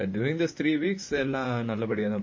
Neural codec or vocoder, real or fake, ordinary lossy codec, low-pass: vocoder, 44.1 kHz, 128 mel bands, Pupu-Vocoder; fake; MP3, 32 kbps; 7.2 kHz